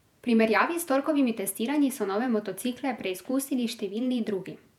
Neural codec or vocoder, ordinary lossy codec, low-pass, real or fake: vocoder, 48 kHz, 128 mel bands, Vocos; none; 19.8 kHz; fake